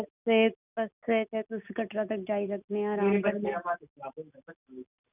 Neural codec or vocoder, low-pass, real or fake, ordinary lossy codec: none; 3.6 kHz; real; none